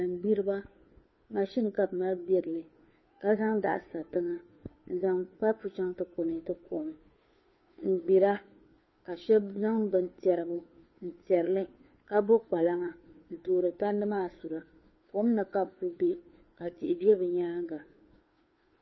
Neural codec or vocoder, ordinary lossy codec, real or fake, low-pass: codec, 16 kHz, 2 kbps, FunCodec, trained on Chinese and English, 25 frames a second; MP3, 24 kbps; fake; 7.2 kHz